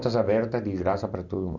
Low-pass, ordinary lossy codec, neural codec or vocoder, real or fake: 7.2 kHz; none; none; real